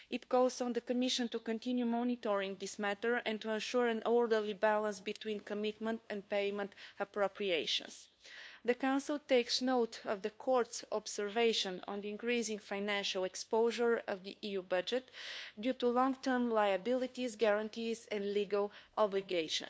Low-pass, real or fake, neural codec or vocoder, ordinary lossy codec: none; fake; codec, 16 kHz, 2 kbps, FunCodec, trained on LibriTTS, 25 frames a second; none